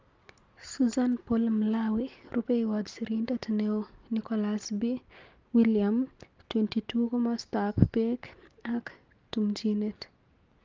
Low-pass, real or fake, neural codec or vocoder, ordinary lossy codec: 7.2 kHz; real; none; Opus, 32 kbps